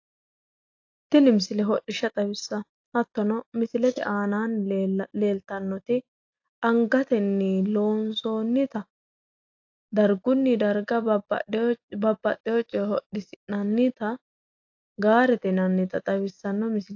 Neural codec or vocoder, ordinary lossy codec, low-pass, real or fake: none; AAC, 48 kbps; 7.2 kHz; real